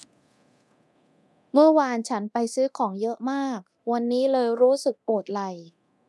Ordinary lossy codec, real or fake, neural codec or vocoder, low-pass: none; fake; codec, 24 kHz, 0.9 kbps, DualCodec; none